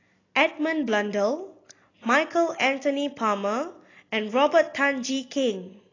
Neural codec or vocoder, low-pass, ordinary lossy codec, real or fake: none; 7.2 kHz; AAC, 32 kbps; real